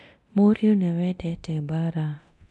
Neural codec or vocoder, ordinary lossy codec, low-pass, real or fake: codec, 24 kHz, 0.9 kbps, DualCodec; none; none; fake